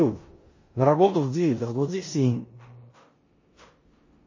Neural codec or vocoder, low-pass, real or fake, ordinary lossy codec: codec, 16 kHz in and 24 kHz out, 0.9 kbps, LongCat-Audio-Codec, four codebook decoder; 7.2 kHz; fake; MP3, 32 kbps